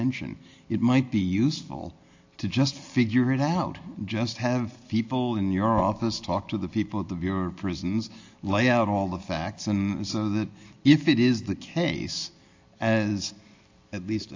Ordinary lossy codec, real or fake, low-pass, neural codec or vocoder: AAC, 48 kbps; real; 7.2 kHz; none